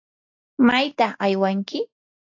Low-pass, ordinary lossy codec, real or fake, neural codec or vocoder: 7.2 kHz; AAC, 48 kbps; real; none